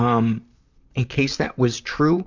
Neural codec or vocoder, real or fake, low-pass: vocoder, 44.1 kHz, 128 mel bands, Pupu-Vocoder; fake; 7.2 kHz